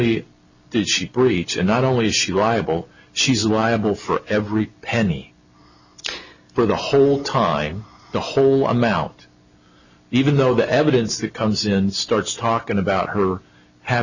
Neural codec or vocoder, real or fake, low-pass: none; real; 7.2 kHz